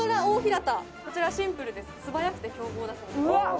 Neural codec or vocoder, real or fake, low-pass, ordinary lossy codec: none; real; none; none